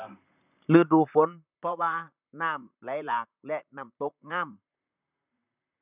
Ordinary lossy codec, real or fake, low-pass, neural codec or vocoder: none; fake; 3.6 kHz; vocoder, 44.1 kHz, 128 mel bands, Pupu-Vocoder